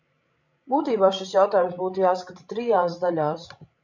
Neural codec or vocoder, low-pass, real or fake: codec, 16 kHz, 16 kbps, FreqCodec, larger model; 7.2 kHz; fake